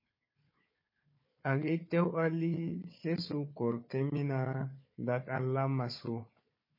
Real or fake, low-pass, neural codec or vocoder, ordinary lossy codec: fake; 5.4 kHz; codec, 16 kHz, 4 kbps, FunCodec, trained on Chinese and English, 50 frames a second; MP3, 24 kbps